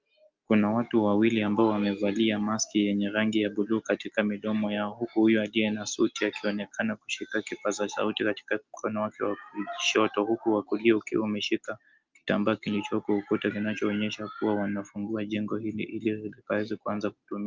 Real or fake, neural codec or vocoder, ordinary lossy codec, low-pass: real; none; Opus, 24 kbps; 7.2 kHz